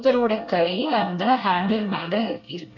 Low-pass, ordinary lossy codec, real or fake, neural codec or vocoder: 7.2 kHz; none; fake; codec, 24 kHz, 1 kbps, SNAC